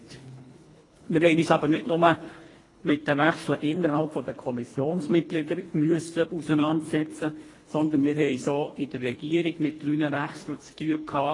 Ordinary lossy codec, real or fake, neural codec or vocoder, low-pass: AAC, 32 kbps; fake; codec, 24 kHz, 1.5 kbps, HILCodec; 10.8 kHz